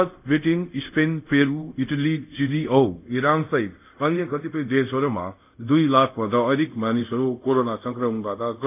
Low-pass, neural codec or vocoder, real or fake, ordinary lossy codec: 3.6 kHz; codec, 24 kHz, 0.5 kbps, DualCodec; fake; none